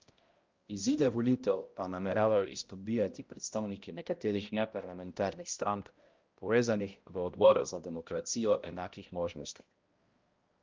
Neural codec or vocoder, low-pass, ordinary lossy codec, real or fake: codec, 16 kHz, 0.5 kbps, X-Codec, HuBERT features, trained on balanced general audio; 7.2 kHz; Opus, 32 kbps; fake